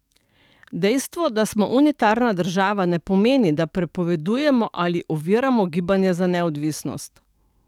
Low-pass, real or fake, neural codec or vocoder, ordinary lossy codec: 19.8 kHz; fake; codec, 44.1 kHz, 7.8 kbps, DAC; none